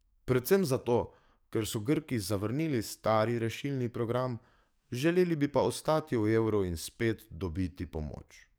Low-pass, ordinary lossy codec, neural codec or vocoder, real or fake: none; none; codec, 44.1 kHz, 7.8 kbps, DAC; fake